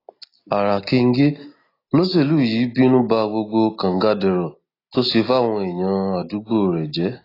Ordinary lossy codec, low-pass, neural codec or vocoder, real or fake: AAC, 24 kbps; 5.4 kHz; none; real